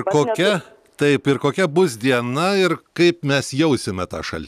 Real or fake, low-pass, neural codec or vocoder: real; 14.4 kHz; none